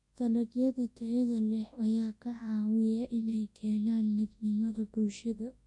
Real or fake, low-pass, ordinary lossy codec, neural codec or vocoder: fake; 10.8 kHz; MP3, 48 kbps; codec, 24 kHz, 0.9 kbps, WavTokenizer, large speech release